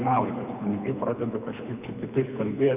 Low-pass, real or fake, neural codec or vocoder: 3.6 kHz; fake; codec, 24 kHz, 3 kbps, HILCodec